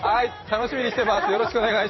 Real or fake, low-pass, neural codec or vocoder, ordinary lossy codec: fake; 7.2 kHz; vocoder, 44.1 kHz, 128 mel bands every 512 samples, BigVGAN v2; MP3, 24 kbps